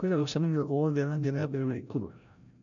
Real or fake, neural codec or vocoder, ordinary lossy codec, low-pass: fake; codec, 16 kHz, 0.5 kbps, FreqCodec, larger model; none; 7.2 kHz